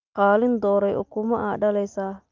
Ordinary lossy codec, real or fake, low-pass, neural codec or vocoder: Opus, 24 kbps; real; 7.2 kHz; none